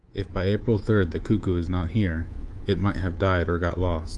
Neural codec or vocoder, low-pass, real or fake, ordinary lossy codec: codec, 24 kHz, 3.1 kbps, DualCodec; 10.8 kHz; fake; Opus, 32 kbps